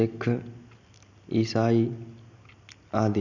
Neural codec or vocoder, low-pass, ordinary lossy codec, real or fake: none; 7.2 kHz; none; real